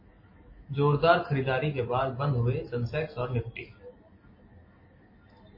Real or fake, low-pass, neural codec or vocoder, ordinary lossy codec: real; 5.4 kHz; none; MP3, 24 kbps